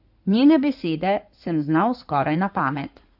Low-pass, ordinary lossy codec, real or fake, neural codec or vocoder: 5.4 kHz; AAC, 48 kbps; fake; codec, 16 kHz in and 24 kHz out, 2.2 kbps, FireRedTTS-2 codec